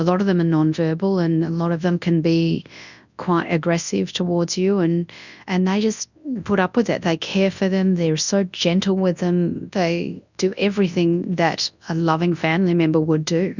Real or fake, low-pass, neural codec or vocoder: fake; 7.2 kHz; codec, 24 kHz, 0.9 kbps, WavTokenizer, large speech release